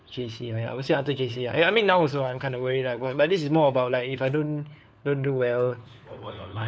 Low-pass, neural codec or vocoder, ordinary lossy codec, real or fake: none; codec, 16 kHz, 4 kbps, FunCodec, trained on LibriTTS, 50 frames a second; none; fake